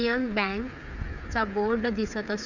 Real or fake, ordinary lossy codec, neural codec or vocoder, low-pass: fake; none; codec, 16 kHz, 8 kbps, FreqCodec, smaller model; 7.2 kHz